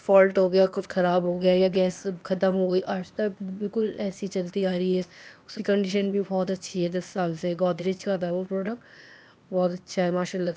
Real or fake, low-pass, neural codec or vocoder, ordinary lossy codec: fake; none; codec, 16 kHz, 0.8 kbps, ZipCodec; none